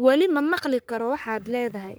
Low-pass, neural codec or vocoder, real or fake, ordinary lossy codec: none; codec, 44.1 kHz, 3.4 kbps, Pupu-Codec; fake; none